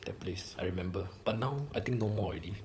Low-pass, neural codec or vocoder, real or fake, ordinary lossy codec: none; codec, 16 kHz, 16 kbps, FunCodec, trained on LibriTTS, 50 frames a second; fake; none